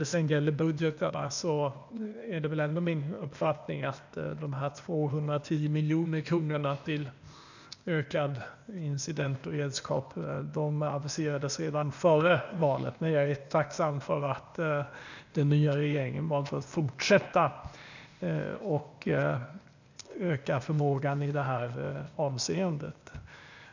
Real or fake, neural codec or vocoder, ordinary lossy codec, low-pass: fake; codec, 16 kHz, 0.8 kbps, ZipCodec; none; 7.2 kHz